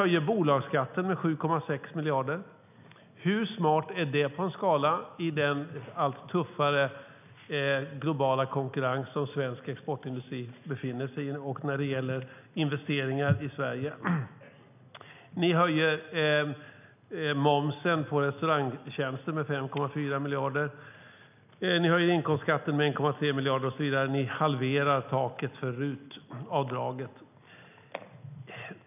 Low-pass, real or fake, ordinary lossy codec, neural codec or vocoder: 3.6 kHz; real; none; none